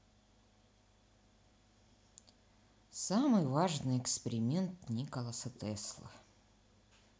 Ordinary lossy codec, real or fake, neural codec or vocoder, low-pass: none; real; none; none